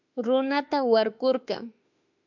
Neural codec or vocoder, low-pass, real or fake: autoencoder, 48 kHz, 32 numbers a frame, DAC-VAE, trained on Japanese speech; 7.2 kHz; fake